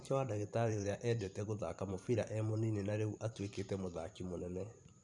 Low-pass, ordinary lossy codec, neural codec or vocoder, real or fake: 10.8 kHz; none; vocoder, 44.1 kHz, 128 mel bands every 512 samples, BigVGAN v2; fake